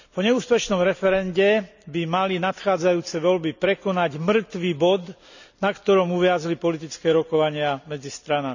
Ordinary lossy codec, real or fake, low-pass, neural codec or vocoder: none; real; 7.2 kHz; none